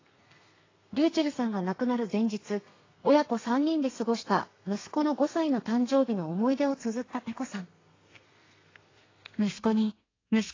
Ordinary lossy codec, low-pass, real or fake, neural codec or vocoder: AAC, 32 kbps; 7.2 kHz; fake; codec, 32 kHz, 1.9 kbps, SNAC